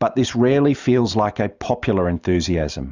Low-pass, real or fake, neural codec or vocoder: 7.2 kHz; real; none